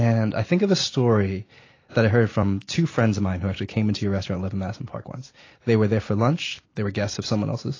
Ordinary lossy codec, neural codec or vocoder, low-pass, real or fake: AAC, 32 kbps; none; 7.2 kHz; real